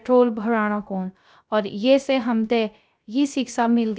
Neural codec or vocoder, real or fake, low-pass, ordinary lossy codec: codec, 16 kHz, 0.3 kbps, FocalCodec; fake; none; none